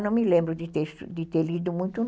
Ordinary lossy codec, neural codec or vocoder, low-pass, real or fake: none; none; none; real